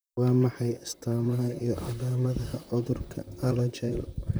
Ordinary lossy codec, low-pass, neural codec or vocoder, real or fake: none; none; vocoder, 44.1 kHz, 128 mel bands, Pupu-Vocoder; fake